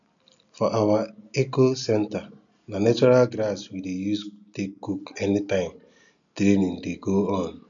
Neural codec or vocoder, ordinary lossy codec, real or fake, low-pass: none; none; real; 7.2 kHz